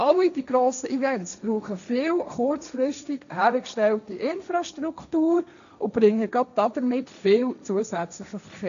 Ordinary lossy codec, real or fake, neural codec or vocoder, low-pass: none; fake; codec, 16 kHz, 1.1 kbps, Voila-Tokenizer; 7.2 kHz